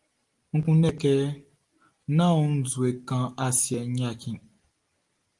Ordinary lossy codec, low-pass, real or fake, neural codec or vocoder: Opus, 24 kbps; 10.8 kHz; real; none